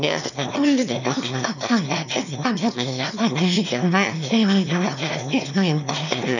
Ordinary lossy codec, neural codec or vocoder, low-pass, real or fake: none; autoencoder, 22.05 kHz, a latent of 192 numbers a frame, VITS, trained on one speaker; 7.2 kHz; fake